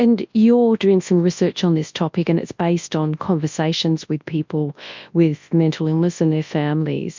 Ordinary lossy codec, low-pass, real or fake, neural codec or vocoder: MP3, 64 kbps; 7.2 kHz; fake; codec, 24 kHz, 0.9 kbps, WavTokenizer, large speech release